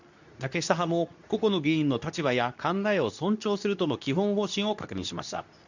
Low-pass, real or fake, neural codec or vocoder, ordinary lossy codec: 7.2 kHz; fake; codec, 24 kHz, 0.9 kbps, WavTokenizer, medium speech release version 2; none